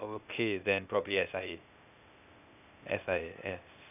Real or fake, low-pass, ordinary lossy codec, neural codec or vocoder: fake; 3.6 kHz; none; codec, 16 kHz, 0.8 kbps, ZipCodec